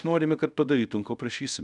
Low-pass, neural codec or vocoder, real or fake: 10.8 kHz; codec, 24 kHz, 0.5 kbps, DualCodec; fake